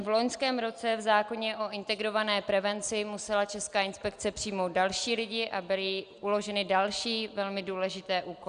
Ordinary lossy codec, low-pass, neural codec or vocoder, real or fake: Opus, 64 kbps; 9.9 kHz; none; real